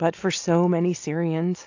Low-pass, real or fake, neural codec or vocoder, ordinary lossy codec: 7.2 kHz; real; none; AAC, 48 kbps